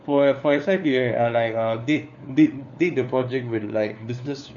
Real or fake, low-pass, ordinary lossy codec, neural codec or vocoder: fake; 7.2 kHz; none; codec, 16 kHz, 4 kbps, FunCodec, trained on LibriTTS, 50 frames a second